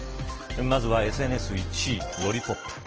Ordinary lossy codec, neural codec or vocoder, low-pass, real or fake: Opus, 16 kbps; none; 7.2 kHz; real